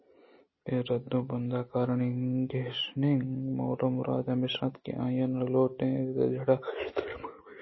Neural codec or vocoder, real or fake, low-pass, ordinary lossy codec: none; real; 7.2 kHz; MP3, 24 kbps